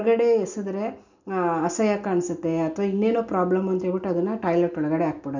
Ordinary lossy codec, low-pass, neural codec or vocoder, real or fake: none; 7.2 kHz; none; real